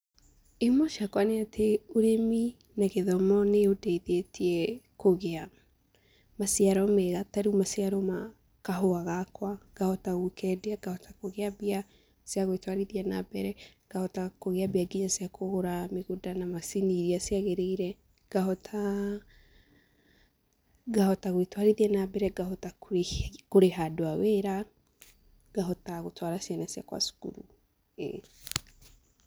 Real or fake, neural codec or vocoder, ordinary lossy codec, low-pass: real; none; none; none